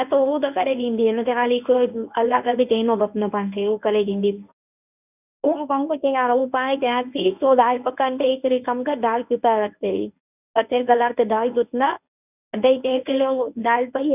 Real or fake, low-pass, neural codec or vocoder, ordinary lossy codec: fake; 3.6 kHz; codec, 24 kHz, 0.9 kbps, WavTokenizer, medium speech release version 1; none